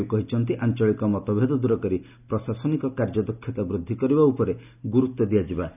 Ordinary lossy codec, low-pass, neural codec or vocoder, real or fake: none; 3.6 kHz; none; real